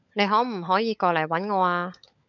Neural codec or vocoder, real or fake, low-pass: codec, 16 kHz, 16 kbps, FunCodec, trained on LibriTTS, 50 frames a second; fake; 7.2 kHz